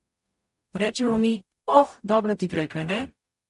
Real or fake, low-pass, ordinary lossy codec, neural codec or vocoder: fake; 14.4 kHz; MP3, 48 kbps; codec, 44.1 kHz, 0.9 kbps, DAC